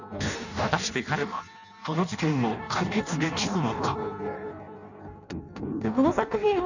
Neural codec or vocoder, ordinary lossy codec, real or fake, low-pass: codec, 16 kHz in and 24 kHz out, 0.6 kbps, FireRedTTS-2 codec; none; fake; 7.2 kHz